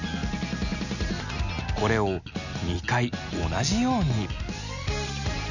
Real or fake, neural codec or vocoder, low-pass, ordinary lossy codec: real; none; 7.2 kHz; none